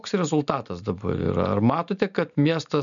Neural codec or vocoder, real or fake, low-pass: none; real; 7.2 kHz